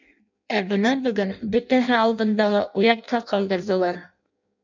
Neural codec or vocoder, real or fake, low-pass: codec, 16 kHz in and 24 kHz out, 0.6 kbps, FireRedTTS-2 codec; fake; 7.2 kHz